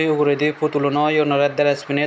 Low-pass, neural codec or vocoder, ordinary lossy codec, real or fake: none; none; none; real